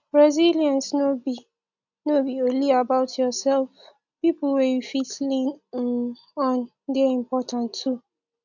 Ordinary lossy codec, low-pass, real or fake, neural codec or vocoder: none; 7.2 kHz; real; none